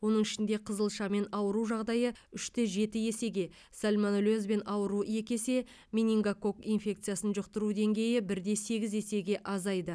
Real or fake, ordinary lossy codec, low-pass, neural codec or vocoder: real; none; none; none